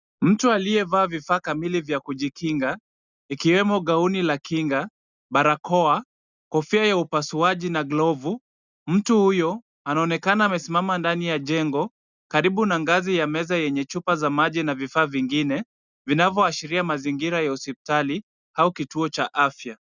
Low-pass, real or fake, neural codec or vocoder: 7.2 kHz; real; none